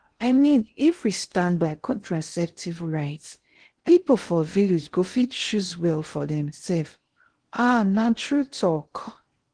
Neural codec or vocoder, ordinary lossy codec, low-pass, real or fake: codec, 16 kHz in and 24 kHz out, 0.6 kbps, FocalCodec, streaming, 2048 codes; Opus, 16 kbps; 9.9 kHz; fake